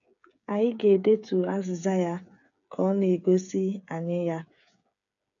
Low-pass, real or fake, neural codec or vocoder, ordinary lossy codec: 7.2 kHz; fake; codec, 16 kHz, 8 kbps, FreqCodec, smaller model; none